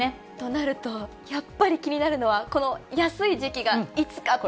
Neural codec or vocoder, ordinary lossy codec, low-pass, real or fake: none; none; none; real